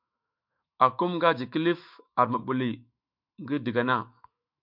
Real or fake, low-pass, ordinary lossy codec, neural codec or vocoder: fake; 5.4 kHz; MP3, 48 kbps; autoencoder, 48 kHz, 128 numbers a frame, DAC-VAE, trained on Japanese speech